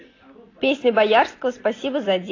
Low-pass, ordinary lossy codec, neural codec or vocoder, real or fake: 7.2 kHz; AAC, 32 kbps; none; real